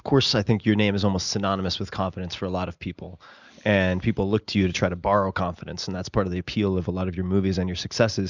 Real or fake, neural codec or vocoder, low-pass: real; none; 7.2 kHz